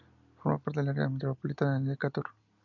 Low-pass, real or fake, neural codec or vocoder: 7.2 kHz; real; none